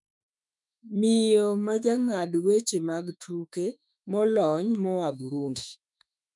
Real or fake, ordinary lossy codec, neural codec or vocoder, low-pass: fake; none; autoencoder, 48 kHz, 32 numbers a frame, DAC-VAE, trained on Japanese speech; 10.8 kHz